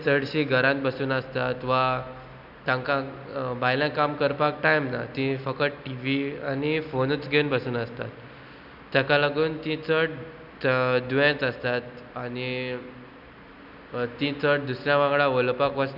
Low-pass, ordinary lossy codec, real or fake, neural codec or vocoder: 5.4 kHz; none; real; none